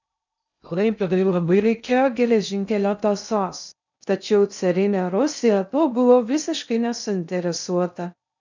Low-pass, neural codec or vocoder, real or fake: 7.2 kHz; codec, 16 kHz in and 24 kHz out, 0.6 kbps, FocalCodec, streaming, 2048 codes; fake